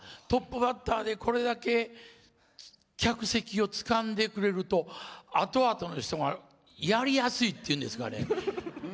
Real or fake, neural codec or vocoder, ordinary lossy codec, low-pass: real; none; none; none